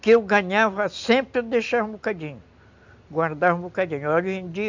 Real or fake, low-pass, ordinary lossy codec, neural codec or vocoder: real; 7.2 kHz; MP3, 64 kbps; none